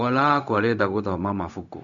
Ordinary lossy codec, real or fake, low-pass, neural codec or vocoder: none; fake; 7.2 kHz; codec, 16 kHz, 0.4 kbps, LongCat-Audio-Codec